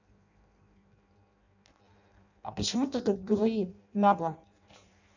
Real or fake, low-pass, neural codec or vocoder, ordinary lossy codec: fake; 7.2 kHz; codec, 16 kHz in and 24 kHz out, 0.6 kbps, FireRedTTS-2 codec; none